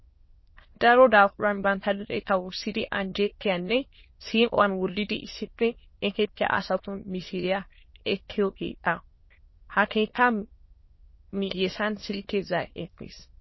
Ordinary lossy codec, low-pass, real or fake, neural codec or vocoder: MP3, 24 kbps; 7.2 kHz; fake; autoencoder, 22.05 kHz, a latent of 192 numbers a frame, VITS, trained on many speakers